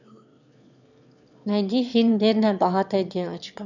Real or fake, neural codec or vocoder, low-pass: fake; autoencoder, 22.05 kHz, a latent of 192 numbers a frame, VITS, trained on one speaker; 7.2 kHz